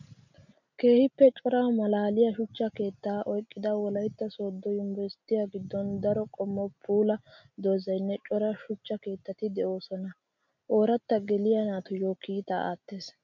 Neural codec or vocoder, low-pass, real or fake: none; 7.2 kHz; real